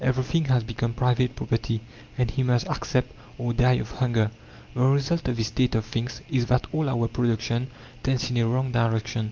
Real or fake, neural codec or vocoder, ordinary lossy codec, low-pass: real; none; Opus, 24 kbps; 7.2 kHz